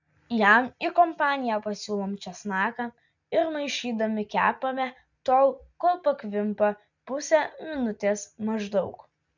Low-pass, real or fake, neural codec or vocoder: 7.2 kHz; real; none